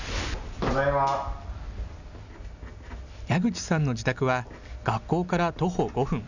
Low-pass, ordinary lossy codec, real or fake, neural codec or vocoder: 7.2 kHz; none; real; none